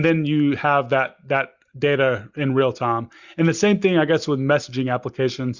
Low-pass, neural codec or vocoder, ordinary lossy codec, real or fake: 7.2 kHz; none; Opus, 64 kbps; real